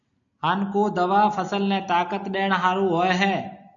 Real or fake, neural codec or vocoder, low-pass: real; none; 7.2 kHz